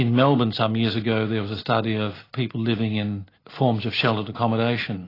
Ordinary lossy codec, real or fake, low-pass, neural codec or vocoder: AAC, 24 kbps; real; 5.4 kHz; none